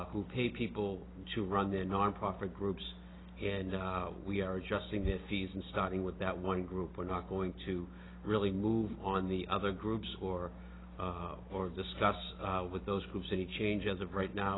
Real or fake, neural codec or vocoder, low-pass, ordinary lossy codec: real; none; 7.2 kHz; AAC, 16 kbps